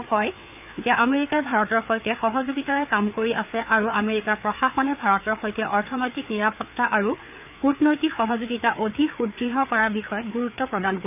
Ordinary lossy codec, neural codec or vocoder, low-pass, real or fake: none; codec, 16 kHz, 4 kbps, FreqCodec, larger model; 3.6 kHz; fake